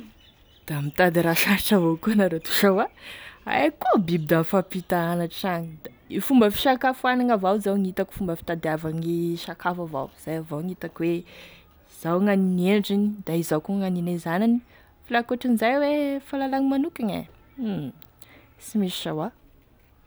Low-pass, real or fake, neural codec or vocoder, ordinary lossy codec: none; real; none; none